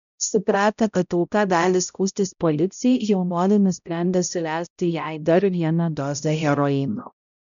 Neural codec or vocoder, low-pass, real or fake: codec, 16 kHz, 0.5 kbps, X-Codec, HuBERT features, trained on balanced general audio; 7.2 kHz; fake